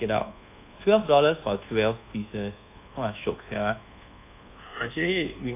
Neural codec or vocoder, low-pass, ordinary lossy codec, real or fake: codec, 24 kHz, 1.2 kbps, DualCodec; 3.6 kHz; AAC, 24 kbps; fake